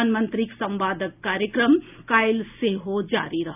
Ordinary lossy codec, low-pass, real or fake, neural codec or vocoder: none; 3.6 kHz; real; none